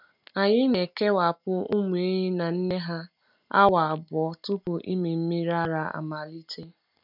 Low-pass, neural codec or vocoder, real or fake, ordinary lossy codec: 5.4 kHz; none; real; none